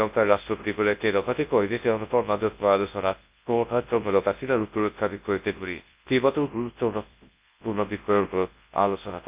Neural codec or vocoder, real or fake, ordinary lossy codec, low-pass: codec, 24 kHz, 0.9 kbps, WavTokenizer, large speech release; fake; Opus, 24 kbps; 3.6 kHz